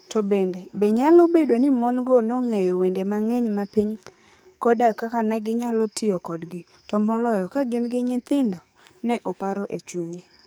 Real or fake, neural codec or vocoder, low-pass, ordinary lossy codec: fake; codec, 44.1 kHz, 2.6 kbps, SNAC; none; none